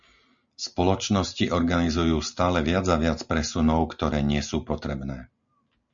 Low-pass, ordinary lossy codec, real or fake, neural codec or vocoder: 7.2 kHz; MP3, 64 kbps; real; none